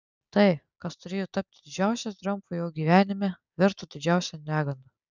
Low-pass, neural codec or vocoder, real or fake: 7.2 kHz; none; real